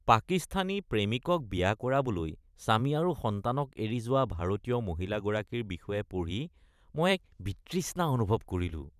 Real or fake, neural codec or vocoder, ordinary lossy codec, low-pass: fake; vocoder, 44.1 kHz, 128 mel bands every 512 samples, BigVGAN v2; none; 14.4 kHz